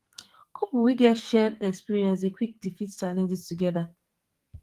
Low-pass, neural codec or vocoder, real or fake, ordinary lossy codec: 14.4 kHz; codec, 44.1 kHz, 2.6 kbps, SNAC; fake; Opus, 24 kbps